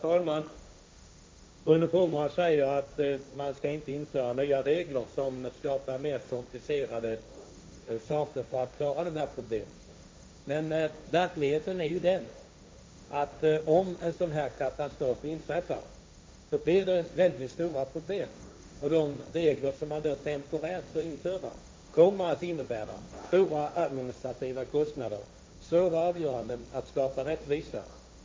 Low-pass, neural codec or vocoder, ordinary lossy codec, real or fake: none; codec, 16 kHz, 1.1 kbps, Voila-Tokenizer; none; fake